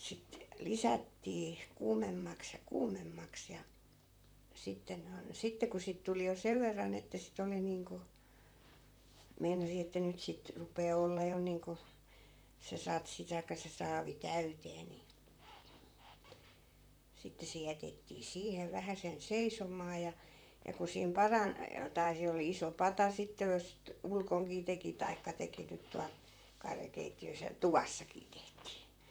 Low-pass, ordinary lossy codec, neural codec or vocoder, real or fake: none; none; vocoder, 44.1 kHz, 128 mel bands, Pupu-Vocoder; fake